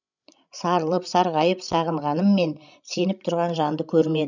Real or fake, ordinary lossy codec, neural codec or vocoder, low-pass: fake; none; codec, 16 kHz, 16 kbps, FreqCodec, larger model; 7.2 kHz